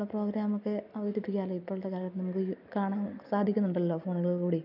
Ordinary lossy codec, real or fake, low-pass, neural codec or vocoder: none; real; 5.4 kHz; none